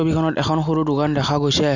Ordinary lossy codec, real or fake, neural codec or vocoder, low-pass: none; real; none; 7.2 kHz